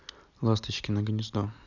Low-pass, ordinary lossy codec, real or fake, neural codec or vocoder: 7.2 kHz; none; real; none